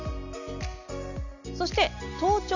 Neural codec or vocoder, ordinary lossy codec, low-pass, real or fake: none; none; 7.2 kHz; real